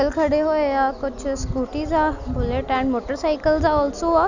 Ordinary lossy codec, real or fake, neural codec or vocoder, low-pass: none; real; none; 7.2 kHz